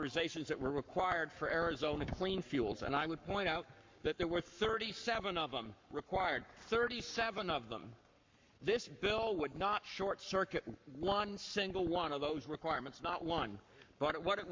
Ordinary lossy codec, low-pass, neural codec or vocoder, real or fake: MP3, 48 kbps; 7.2 kHz; codec, 44.1 kHz, 7.8 kbps, Pupu-Codec; fake